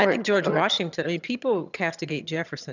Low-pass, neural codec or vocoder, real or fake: 7.2 kHz; vocoder, 22.05 kHz, 80 mel bands, HiFi-GAN; fake